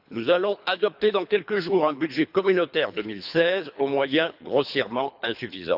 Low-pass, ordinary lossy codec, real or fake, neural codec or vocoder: 5.4 kHz; none; fake; codec, 24 kHz, 3 kbps, HILCodec